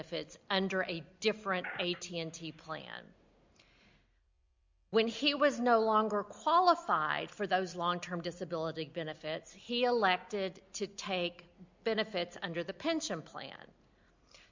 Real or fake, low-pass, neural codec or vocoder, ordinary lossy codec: real; 7.2 kHz; none; MP3, 64 kbps